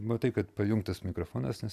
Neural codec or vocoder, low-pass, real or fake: none; 14.4 kHz; real